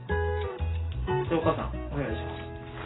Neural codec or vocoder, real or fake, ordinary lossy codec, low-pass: none; real; AAC, 16 kbps; 7.2 kHz